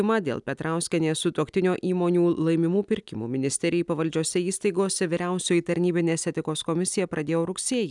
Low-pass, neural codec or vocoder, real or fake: 10.8 kHz; none; real